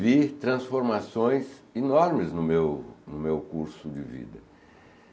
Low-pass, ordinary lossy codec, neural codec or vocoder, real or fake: none; none; none; real